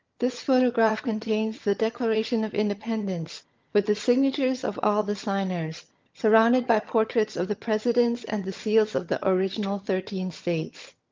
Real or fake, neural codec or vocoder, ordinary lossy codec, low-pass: fake; vocoder, 22.05 kHz, 80 mel bands, HiFi-GAN; Opus, 32 kbps; 7.2 kHz